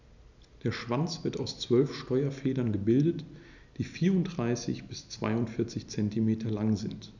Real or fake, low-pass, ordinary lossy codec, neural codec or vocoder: real; 7.2 kHz; none; none